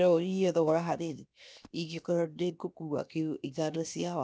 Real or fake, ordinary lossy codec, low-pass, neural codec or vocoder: fake; none; none; codec, 16 kHz, 0.7 kbps, FocalCodec